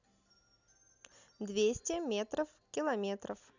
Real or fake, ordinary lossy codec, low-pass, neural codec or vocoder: real; Opus, 64 kbps; 7.2 kHz; none